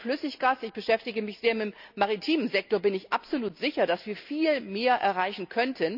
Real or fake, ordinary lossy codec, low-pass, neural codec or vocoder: real; none; 5.4 kHz; none